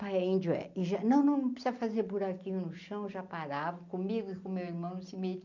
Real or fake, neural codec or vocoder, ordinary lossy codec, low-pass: real; none; none; 7.2 kHz